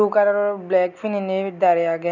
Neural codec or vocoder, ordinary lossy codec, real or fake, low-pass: none; none; real; 7.2 kHz